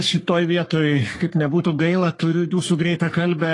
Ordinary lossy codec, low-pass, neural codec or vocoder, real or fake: AAC, 32 kbps; 10.8 kHz; codec, 44.1 kHz, 3.4 kbps, Pupu-Codec; fake